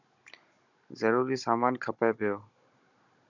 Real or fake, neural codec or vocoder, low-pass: fake; codec, 16 kHz, 16 kbps, FunCodec, trained on Chinese and English, 50 frames a second; 7.2 kHz